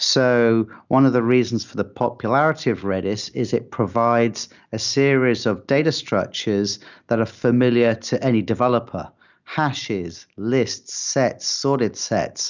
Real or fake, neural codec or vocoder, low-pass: real; none; 7.2 kHz